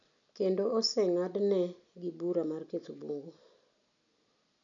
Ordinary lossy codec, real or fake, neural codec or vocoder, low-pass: none; real; none; 7.2 kHz